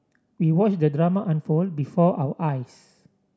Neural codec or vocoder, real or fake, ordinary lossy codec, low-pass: none; real; none; none